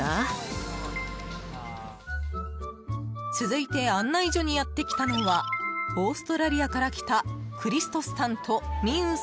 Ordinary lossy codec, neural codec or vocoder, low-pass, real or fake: none; none; none; real